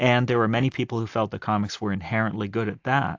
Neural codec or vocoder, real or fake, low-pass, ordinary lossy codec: none; real; 7.2 kHz; AAC, 48 kbps